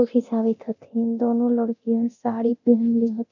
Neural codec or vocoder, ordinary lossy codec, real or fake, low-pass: codec, 24 kHz, 0.9 kbps, DualCodec; none; fake; 7.2 kHz